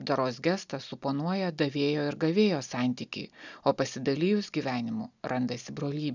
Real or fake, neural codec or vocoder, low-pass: real; none; 7.2 kHz